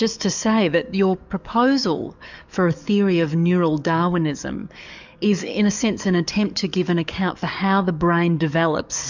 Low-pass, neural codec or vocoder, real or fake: 7.2 kHz; codec, 44.1 kHz, 7.8 kbps, DAC; fake